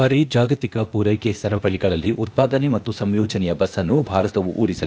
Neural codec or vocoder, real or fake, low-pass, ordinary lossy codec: codec, 16 kHz, 0.8 kbps, ZipCodec; fake; none; none